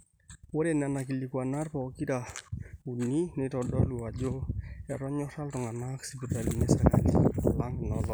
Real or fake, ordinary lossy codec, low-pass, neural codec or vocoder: real; none; none; none